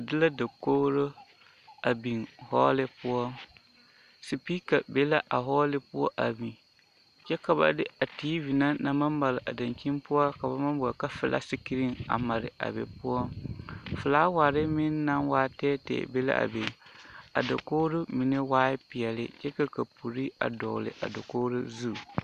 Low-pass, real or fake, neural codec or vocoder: 14.4 kHz; real; none